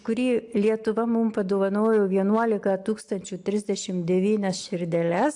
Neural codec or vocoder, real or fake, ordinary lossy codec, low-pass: none; real; AAC, 64 kbps; 10.8 kHz